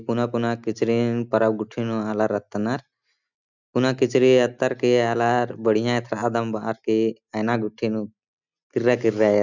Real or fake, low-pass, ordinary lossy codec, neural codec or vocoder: real; 7.2 kHz; none; none